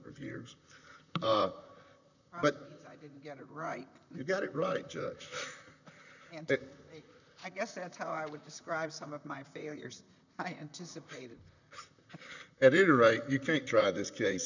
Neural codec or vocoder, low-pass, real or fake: vocoder, 44.1 kHz, 128 mel bands, Pupu-Vocoder; 7.2 kHz; fake